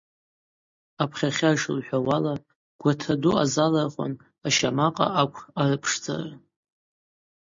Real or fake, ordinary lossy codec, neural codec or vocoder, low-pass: real; MP3, 48 kbps; none; 7.2 kHz